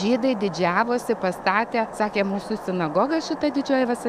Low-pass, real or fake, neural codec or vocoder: 14.4 kHz; fake; autoencoder, 48 kHz, 128 numbers a frame, DAC-VAE, trained on Japanese speech